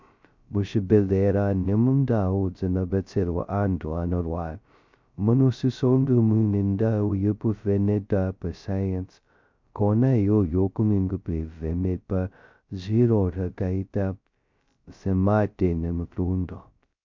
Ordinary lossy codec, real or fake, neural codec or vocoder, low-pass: MP3, 64 kbps; fake; codec, 16 kHz, 0.2 kbps, FocalCodec; 7.2 kHz